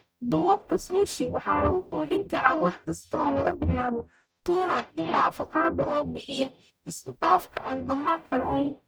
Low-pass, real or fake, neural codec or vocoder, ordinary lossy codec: none; fake; codec, 44.1 kHz, 0.9 kbps, DAC; none